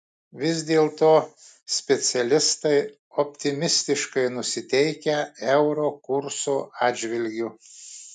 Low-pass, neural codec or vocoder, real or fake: 9.9 kHz; none; real